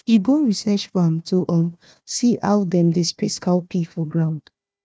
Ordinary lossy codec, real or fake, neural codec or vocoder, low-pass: none; fake; codec, 16 kHz, 1 kbps, FunCodec, trained on Chinese and English, 50 frames a second; none